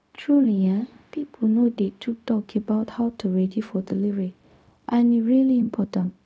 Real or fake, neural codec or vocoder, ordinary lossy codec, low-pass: fake; codec, 16 kHz, 0.4 kbps, LongCat-Audio-Codec; none; none